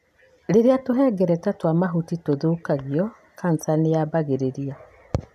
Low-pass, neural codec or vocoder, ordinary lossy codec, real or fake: 14.4 kHz; none; none; real